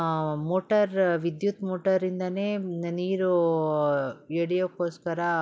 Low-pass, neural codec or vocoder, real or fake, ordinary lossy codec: none; none; real; none